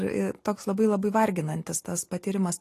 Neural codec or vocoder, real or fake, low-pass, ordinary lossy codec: none; real; 14.4 kHz; AAC, 48 kbps